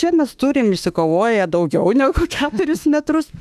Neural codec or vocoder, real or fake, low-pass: autoencoder, 48 kHz, 32 numbers a frame, DAC-VAE, trained on Japanese speech; fake; 14.4 kHz